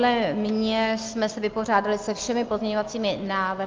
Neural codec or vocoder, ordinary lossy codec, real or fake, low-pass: none; Opus, 24 kbps; real; 7.2 kHz